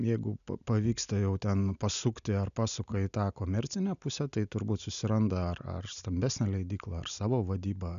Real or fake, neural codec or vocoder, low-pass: real; none; 7.2 kHz